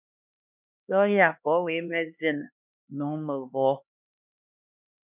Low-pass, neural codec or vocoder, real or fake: 3.6 kHz; codec, 16 kHz, 2 kbps, X-Codec, HuBERT features, trained on LibriSpeech; fake